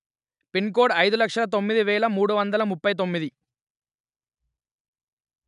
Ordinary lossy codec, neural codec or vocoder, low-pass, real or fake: none; none; 10.8 kHz; real